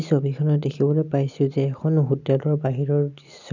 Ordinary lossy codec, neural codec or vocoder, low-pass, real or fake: none; none; 7.2 kHz; real